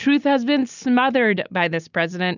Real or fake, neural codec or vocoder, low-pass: real; none; 7.2 kHz